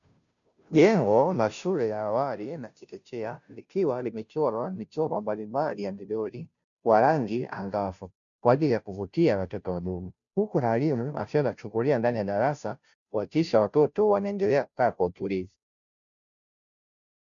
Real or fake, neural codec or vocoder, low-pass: fake; codec, 16 kHz, 0.5 kbps, FunCodec, trained on Chinese and English, 25 frames a second; 7.2 kHz